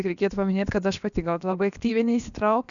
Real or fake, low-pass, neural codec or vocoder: fake; 7.2 kHz; codec, 16 kHz, about 1 kbps, DyCAST, with the encoder's durations